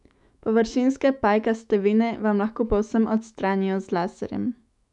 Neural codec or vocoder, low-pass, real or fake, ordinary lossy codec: autoencoder, 48 kHz, 128 numbers a frame, DAC-VAE, trained on Japanese speech; 10.8 kHz; fake; none